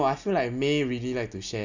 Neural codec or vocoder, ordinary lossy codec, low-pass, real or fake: none; none; 7.2 kHz; real